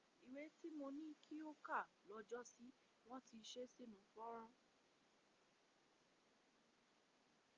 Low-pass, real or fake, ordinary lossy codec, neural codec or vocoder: 7.2 kHz; real; Opus, 32 kbps; none